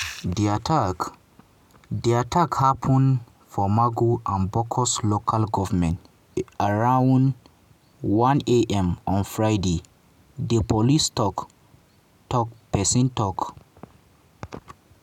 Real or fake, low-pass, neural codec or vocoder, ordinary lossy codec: real; 19.8 kHz; none; none